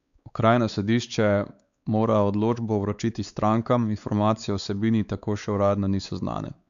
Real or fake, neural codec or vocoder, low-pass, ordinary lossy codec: fake; codec, 16 kHz, 4 kbps, X-Codec, WavLM features, trained on Multilingual LibriSpeech; 7.2 kHz; none